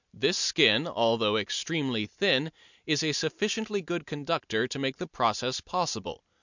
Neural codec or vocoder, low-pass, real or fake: none; 7.2 kHz; real